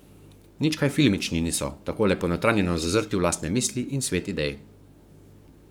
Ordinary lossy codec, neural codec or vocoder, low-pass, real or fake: none; codec, 44.1 kHz, 7.8 kbps, Pupu-Codec; none; fake